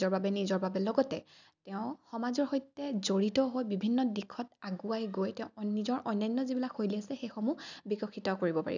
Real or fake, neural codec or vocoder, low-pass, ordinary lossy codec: real; none; 7.2 kHz; none